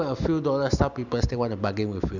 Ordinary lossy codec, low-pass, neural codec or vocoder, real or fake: none; 7.2 kHz; none; real